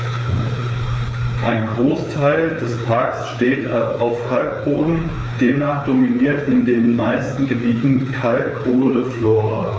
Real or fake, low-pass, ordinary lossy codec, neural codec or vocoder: fake; none; none; codec, 16 kHz, 4 kbps, FreqCodec, larger model